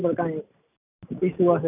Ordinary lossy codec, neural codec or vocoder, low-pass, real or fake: none; none; 3.6 kHz; real